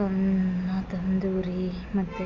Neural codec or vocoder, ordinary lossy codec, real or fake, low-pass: none; Opus, 64 kbps; real; 7.2 kHz